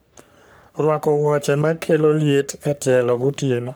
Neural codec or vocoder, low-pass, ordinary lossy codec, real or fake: codec, 44.1 kHz, 3.4 kbps, Pupu-Codec; none; none; fake